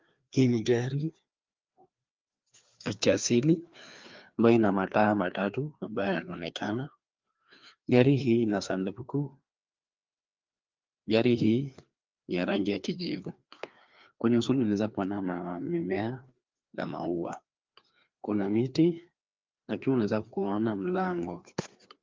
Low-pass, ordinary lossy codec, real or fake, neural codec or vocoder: 7.2 kHz; Opus, 32 kbps; fake; codec, 16 kHz, 2 kbps, FreqCodec, larger model